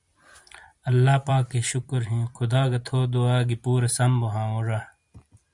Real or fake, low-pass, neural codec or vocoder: fake; 10.8 kHz; vocoder, 44.1 kHz, 128 mel bands every 256 samples, BigVGAN v2